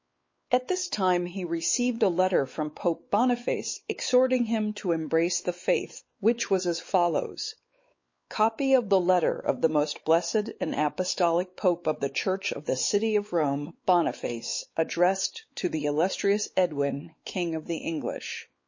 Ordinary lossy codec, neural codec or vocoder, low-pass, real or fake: MP3, 32 kbps; codec, 16 kHz, 4 kbps, X-Codec, WavLM features, trained on Multilingual LibriSpeech; 7.2 kHz; fake